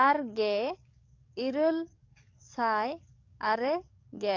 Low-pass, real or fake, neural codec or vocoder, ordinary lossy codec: 7.2 kHz; fake; codec, 44.1 kHz, 7.8 kbps, DAC; AAC, 48 kbps